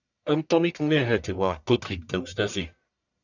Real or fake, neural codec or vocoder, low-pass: fake; codec, 44.1 kHz, 1.7 kbps, Pupu-Codec; 7.2 kHz